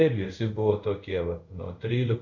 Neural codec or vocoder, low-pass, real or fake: codec, 24 kHz, 0.5 kbps, DualCodec; 7.2 kHz; fake